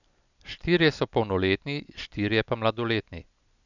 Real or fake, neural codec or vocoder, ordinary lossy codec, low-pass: real; none; none; 7.2 kHz